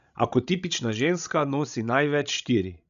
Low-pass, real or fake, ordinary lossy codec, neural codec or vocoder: 7.2 kHz; fake; none; codec, 16 kHz, 16 kbps, FreqCodec, larger model